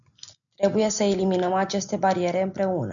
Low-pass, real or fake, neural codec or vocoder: 7.2 kHz; real; none